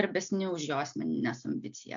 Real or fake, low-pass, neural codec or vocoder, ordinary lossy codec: real; 7.2 kHz; none; AAC, 64 kbps